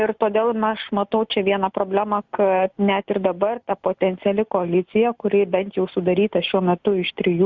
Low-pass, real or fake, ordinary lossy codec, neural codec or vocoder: 7.2 kHz; real; Opus, 64 kbps; none